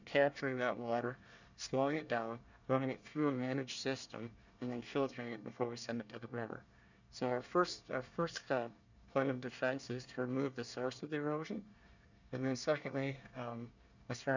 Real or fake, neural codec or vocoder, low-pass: fake; codec, 24 kHz, 1 kbps, SNAC; 7.2 kHz